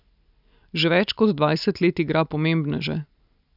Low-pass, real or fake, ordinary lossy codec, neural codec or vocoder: 5.4 kHz; real; none; none